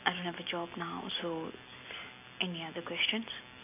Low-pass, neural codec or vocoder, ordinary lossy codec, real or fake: 3.6 kHz; none; none; real